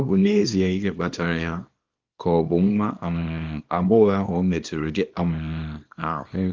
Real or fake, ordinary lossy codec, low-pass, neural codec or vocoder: fake; Opus, 32 kbps; 7.2 kHz; codec, 24 kHz, 0.9 kbps, WavTokenizer, small release